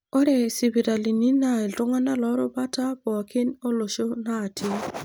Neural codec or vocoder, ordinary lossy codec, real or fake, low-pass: none; none; real; none